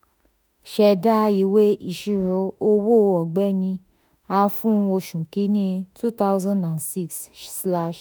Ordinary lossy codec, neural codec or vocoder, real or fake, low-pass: none; autoencoder, 48 kHz, 32 numbers a frame, DAC-VAE, trained on Japanese speech; fake; none